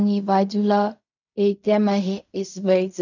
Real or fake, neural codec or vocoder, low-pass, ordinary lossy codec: fake; codec, 16 kHz in and 24 kHz out, 0.4 kbps, LongCat-Audio-Codec, fine tuned four codebook decoder; 7.2 kHz; none